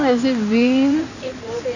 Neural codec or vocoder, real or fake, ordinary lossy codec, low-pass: codec, 16 kHz in and 24 kHz out, 2.2 kbps, FireRedTTS-2 codec; fake; none; 7.2 kHz